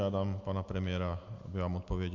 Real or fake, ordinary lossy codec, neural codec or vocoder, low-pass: real; Opus, 64 kbps; none; 7.2 kHz